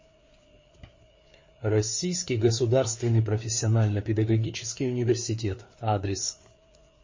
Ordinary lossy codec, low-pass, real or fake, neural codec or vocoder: MP3, 32 kbps; 7.2 kHz; fake; codec, 16 kHz, 4 kbps, FreqCodec, larger model